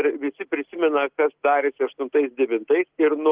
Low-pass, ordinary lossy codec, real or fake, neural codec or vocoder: 3.6 kHz; Opus, 16 kbps; real; none